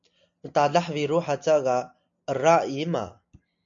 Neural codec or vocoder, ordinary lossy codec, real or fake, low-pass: none; MP3, 64 kbps; real; 7.2 kHz